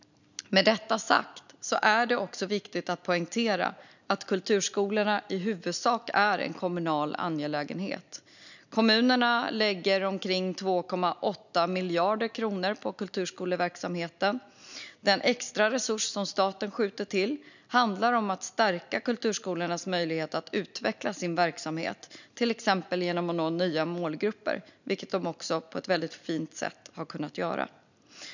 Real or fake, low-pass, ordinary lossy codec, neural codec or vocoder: real; 7.2 kHz; none; none